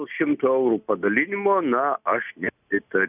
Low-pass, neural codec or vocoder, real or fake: 3.6 kHz; none; real